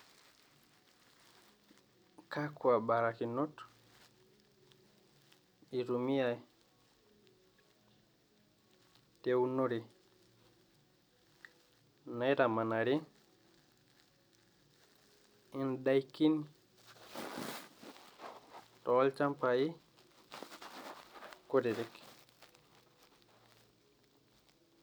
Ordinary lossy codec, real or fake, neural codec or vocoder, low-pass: none; real; none; none